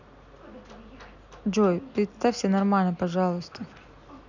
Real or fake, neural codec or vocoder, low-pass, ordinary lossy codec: real; none; 7.2 kHz; none